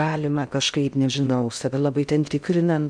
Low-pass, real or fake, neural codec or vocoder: 9.9 kHz; fake; codec, 16 kHz in and 24 kHz out, 0.6 kbps, FocalCodec, streaming, 2048 codes